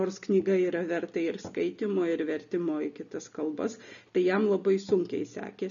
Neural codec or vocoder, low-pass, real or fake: none; 7.2 kHz; real